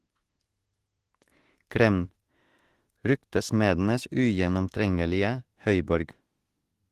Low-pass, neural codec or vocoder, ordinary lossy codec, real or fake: 14.4 kHz; autoencoder, 48 kHz, 32 numbers a frame, DAC-VAE, trained on Japanese speech; Opus, 16 kbps; fake